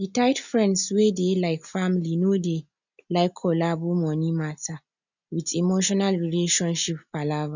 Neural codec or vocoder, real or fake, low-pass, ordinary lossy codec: none; real; 7.2 kHz; none